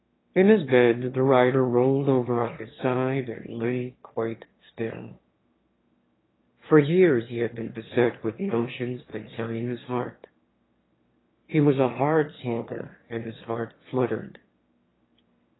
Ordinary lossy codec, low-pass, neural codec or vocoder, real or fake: AAC, 16 kbps; 7.2 kHz; autoencoder, 22.05 kHz, a latent of 192 numbers a frame, VITS, trained on one speaker; fake